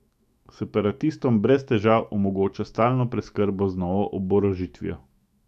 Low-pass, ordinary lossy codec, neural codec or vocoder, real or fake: 14.4 kHz; AAC, 96 kbps; autoencoder, 48 kHz, 128 numbers a frame, DAC-VAE, trained on Japanese speech; fake